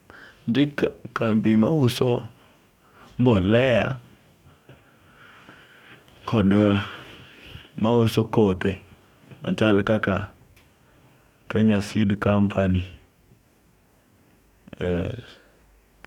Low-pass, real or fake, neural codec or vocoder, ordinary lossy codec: 19.8 kHz; fake; codec, 44.1 kHz, 2.6 kbps, DAC; none